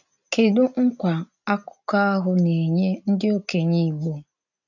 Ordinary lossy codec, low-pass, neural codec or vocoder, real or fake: none; 7.2 kHz; none; real